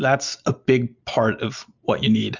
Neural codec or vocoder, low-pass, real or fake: none; 7.2 kHz; real